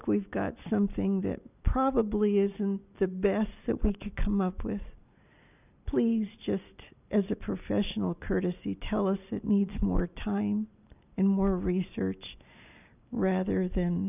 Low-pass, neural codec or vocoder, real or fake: 3.6 kHz; none; real